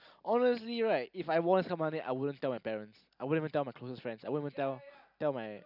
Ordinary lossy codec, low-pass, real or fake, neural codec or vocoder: none; 5.4 kHz; real; none